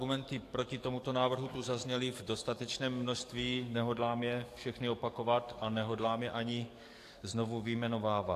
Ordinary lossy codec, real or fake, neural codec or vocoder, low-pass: AAC, 64 kbps; fake; codec, 44.1 kHz, 7.8 kbps, DAC; 14.4 kHz